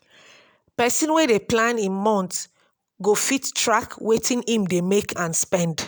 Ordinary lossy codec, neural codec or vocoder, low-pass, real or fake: none; none; none; real